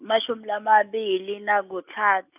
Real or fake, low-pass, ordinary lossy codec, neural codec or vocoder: real; 3.6 kHz; none; none